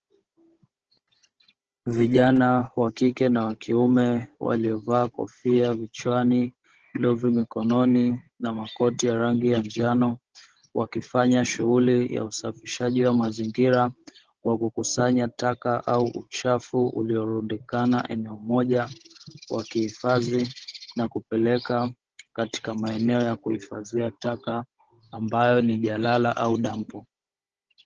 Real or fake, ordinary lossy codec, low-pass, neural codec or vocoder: fake; Opus, 16 kbps; 7.2 kHz; codec, 16 kHz, 16 kbps, FunCodec, trained on Chinese and English, 50 frames a second